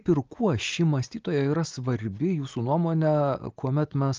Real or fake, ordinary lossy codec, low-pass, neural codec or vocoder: real; Opus, 24 kbps; 7.2 kHz; none